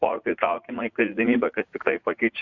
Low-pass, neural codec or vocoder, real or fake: 7.2 kHz; vocoder, 44.1 kHz, 80 mel bands, Vocos; fake